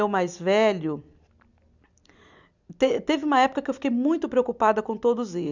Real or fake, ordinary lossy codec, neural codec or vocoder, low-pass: real; none; none; 7.2 kHz